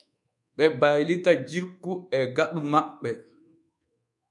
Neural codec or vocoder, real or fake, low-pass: codec, 24 kHz, 1.2 kbps, DualCodec; fake; 10.8 kHz